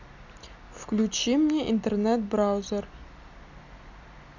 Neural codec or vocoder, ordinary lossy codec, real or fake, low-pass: none; none; real; 7.2 kHz